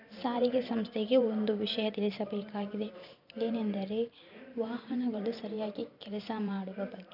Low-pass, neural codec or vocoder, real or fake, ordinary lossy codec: 5.4 kHz; vocoder, 44.1 kHz, 128 mel bands every 512 samples, BigVGAN v2; fake; none